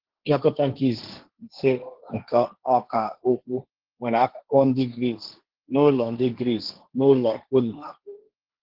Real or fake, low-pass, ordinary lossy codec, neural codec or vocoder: fake; 5.4 kHz; Opus, 16 kbps; codec, 16 kHz, 1.1 kbps, Voila-Tokenizer